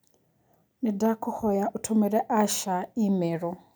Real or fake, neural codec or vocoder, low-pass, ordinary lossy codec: real; none; none; none